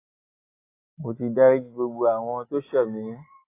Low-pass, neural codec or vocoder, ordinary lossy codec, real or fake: 3.6 kHz; none; AAC, 24 kbps; real